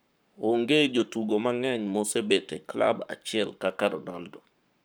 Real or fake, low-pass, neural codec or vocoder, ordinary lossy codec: fake; none; codec, 44.1 kHz, 7.8 kbps, Pupu-Codec; none